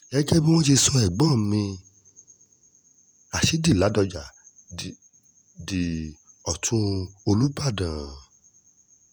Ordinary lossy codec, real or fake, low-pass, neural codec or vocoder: none; fake; none; vocoder, 48 kHz, 128 mel bands, Vocos